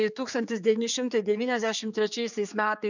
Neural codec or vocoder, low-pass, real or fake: codec, 16 kHz, 4 kbps, X-Codec, HuBERT features, trained on general audio; 7.2 kHz; fake